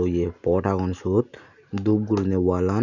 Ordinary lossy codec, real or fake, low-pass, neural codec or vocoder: none; real; 7.2 kHz; none